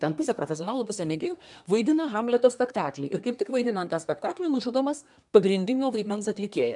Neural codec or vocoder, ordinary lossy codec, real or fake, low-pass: codec, 24 kHz, 1 kbps, SNAC; MP3, 96 kbps; fake; 10.8 kHz